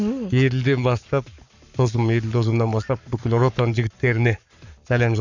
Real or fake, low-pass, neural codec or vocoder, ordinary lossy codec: fake; 7.2 kHz; codec, 44.1 kHz, 7.8 kbps, Pupu-Codec; none